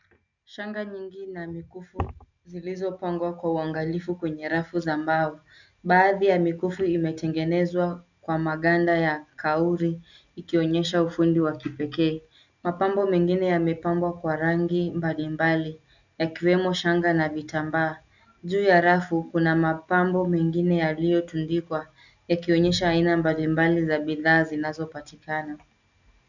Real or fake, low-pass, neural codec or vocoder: real; 7.2 kHz; none